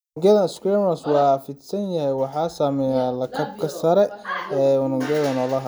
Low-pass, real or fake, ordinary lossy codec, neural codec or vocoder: none; real; none; none